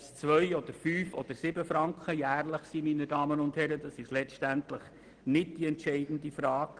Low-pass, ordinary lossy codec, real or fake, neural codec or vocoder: 9.9 kHz; Opus, 16 kbps; real; none